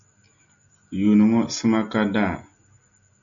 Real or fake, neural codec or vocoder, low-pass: real; none; 7.2 kHz